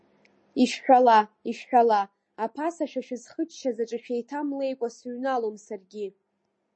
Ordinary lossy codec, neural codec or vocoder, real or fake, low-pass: MP3, 32 kbps; none; real; 9.9 kHz